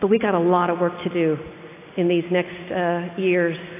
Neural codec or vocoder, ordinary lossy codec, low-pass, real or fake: none; AAC, 24 kbps; 3.6 kHz; real